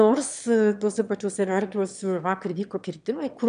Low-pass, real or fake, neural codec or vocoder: 9.9 kHz; fake; autoencoder, 22.05 kHz, a latent of 192 numbers a frame, VITS, trained on one speaker